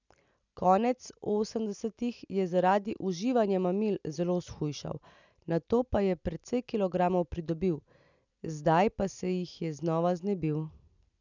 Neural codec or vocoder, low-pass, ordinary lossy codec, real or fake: none; 7.2 kHz; none; real